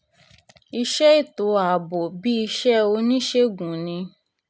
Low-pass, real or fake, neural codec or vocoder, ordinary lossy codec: none; real; none; none